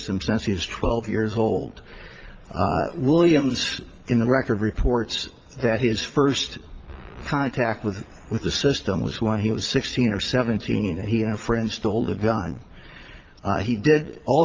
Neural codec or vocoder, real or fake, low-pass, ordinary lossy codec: vocoder, 22.05 kHz, 80 mel bands, Vocos; fake; 7.2 kHz; Opus, 24 kbps